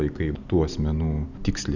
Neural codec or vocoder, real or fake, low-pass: none; real; 7.2 kHz